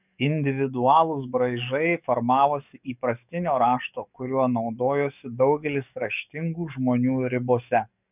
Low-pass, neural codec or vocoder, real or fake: 3.6 kHz; codec, 44.1 kHz, 7.8 kbps, DAC; fake